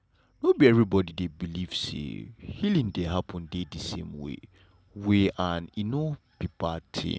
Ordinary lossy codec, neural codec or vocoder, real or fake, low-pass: none; none; real; none